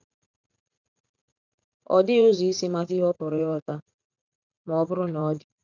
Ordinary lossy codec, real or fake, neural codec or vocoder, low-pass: none; fake; vocoder, 44.1 kHz, 80 mel bands, Vocos; 7.2 kHz